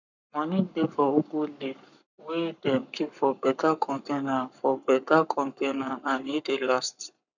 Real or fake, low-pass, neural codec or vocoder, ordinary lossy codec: fake; 7.2 kHz; codec, 44.1 kHz, 7.8 kbps, Pupu-Codec; none